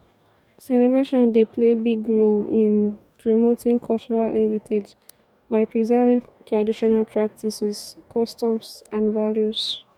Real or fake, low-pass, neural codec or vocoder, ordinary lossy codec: fake; 19.8 kHz; codec, 44.1 kHz, 2.6 kbps, DAC; none